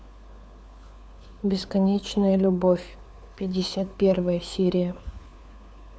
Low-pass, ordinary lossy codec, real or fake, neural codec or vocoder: none; none; fake; codec, 16 kHz, 4 kbps, FunCodec, trained on LibriTTS, 50 frames a second